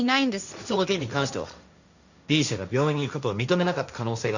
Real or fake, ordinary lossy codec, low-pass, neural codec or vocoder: fake; none; 7.2 kHz; codec, 16 kHz, 1.1 kbps, Voila-Tokenizer